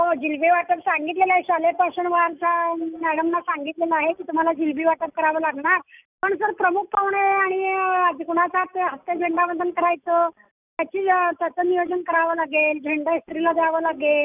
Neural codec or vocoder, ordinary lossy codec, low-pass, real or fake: none; none; 3.6 kHz; real